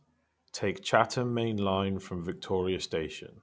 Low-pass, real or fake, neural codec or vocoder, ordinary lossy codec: none; real; none; none